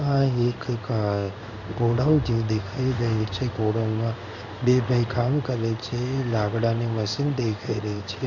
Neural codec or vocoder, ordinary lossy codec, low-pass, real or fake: codec, 16 kHz in and 24 kHz out, 1 kbps, XY-Tokenizer; none; 7.2 kHz; fake